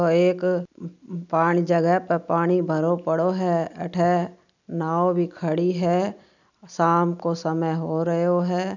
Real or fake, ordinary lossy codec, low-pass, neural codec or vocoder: real; none; 7.2 kHz; none